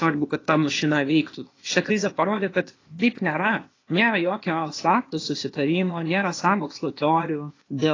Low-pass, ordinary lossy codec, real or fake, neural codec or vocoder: 7.2 kHz; AAC, 32 kbps; fake; codec, 16 kHz, 0.8 kbps, ZipCodec